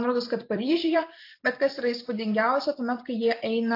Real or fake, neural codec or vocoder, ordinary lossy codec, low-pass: fake; vocoder, 44.1 kHz, 128 mel bands every 256 samples, BigVGAN v2; AAC, 32 kbps; 5.4 kHz